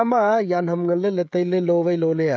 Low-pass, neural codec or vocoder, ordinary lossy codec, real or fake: none; codec, 16 kHz, 16 kbps, FreqCodec, smaller model; none; fake